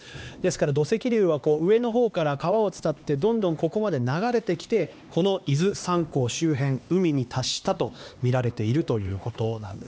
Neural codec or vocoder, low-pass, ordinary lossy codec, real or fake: codec, 16 kHz, 2 kbps, X-Codec, HuBERT features, trained on LibriSpeech; none; none; fake